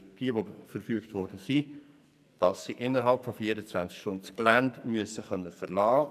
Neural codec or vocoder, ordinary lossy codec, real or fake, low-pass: codec, 44.1 kHz, 2.6 kbps, SNAC; none; fake; 14.4 kHz